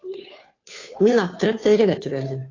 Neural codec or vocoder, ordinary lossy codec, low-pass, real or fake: codec, 16 kHz, 2 kbps, FunCodec, trained on Chinese and English, 25 frames a second; AAC, 32 kbps; 7.2 kHz; fake